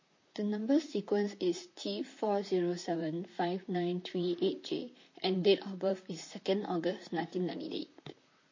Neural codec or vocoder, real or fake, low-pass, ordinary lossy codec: vocoder, 44.1 kHz, 128 mel bands, Pupu-Vocoder; fake; 7.2 kHz; MP3, 32 kbps